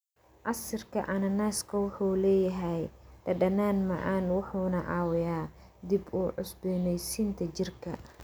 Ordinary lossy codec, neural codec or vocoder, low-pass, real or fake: none; none; none; real